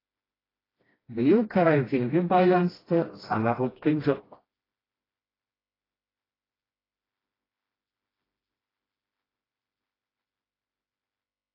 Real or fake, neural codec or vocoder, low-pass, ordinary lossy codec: fake; codec, 16 kHz, 1 kbps, FreqCodec, smaller model; 5.4 kHz; AAC, 24 kbps